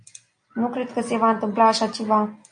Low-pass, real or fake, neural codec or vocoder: 9.9 kHz; real; none